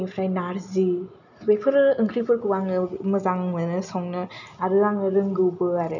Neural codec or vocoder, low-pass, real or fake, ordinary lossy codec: none; 7.2 kHz; real; none